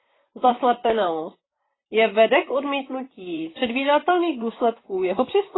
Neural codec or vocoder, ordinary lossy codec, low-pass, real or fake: vocoder, 44.1 kHz, 128 mel bands, Pupu-Vocoder; AAC, 16 kbps; 7.2 kHz; fake